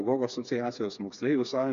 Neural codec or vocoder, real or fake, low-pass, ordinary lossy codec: codec, 16 kHz, 4 kbps, FreqCodec, smaller model; fake; 7.2 kHz; AAC, 96 kbps